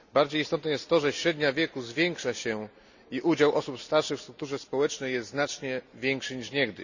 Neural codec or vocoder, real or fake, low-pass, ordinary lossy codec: none; real; 7.2 kHz; none